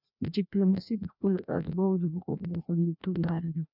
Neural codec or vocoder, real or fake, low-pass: codec, 16 kHz, 1 kbps, FreqCodec, larger model; fake; 5.4 kHz